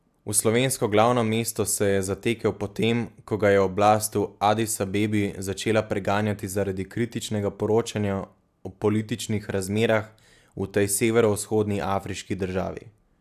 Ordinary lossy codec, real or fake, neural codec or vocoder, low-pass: AAC, 96 kbps; real; none; 14.4 kHz